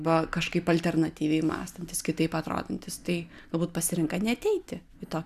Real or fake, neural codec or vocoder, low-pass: real; none; 14.4 kHz